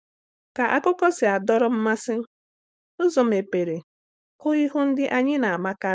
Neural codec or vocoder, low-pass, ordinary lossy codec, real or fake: codec, 16 kHz, 4.8 kbps, FACodec; none; none; fake